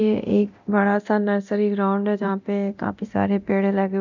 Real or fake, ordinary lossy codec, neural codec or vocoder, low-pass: fake; none; codec, 24 kHz, 0.9 kbps, DualCodec; 7.2 kHz